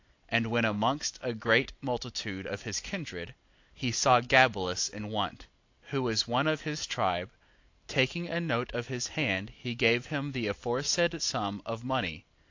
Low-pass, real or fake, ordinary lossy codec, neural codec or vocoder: 7.2 kHz; real; AAC, 48 kbps; none